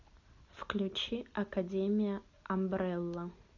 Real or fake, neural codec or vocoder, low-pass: real; none; 7.2 kHz